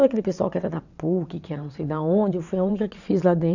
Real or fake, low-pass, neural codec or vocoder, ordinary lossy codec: fake; 7.2 kHz; vocoder, 22.05 kHz, 80 mel bands, WaveNeXt; none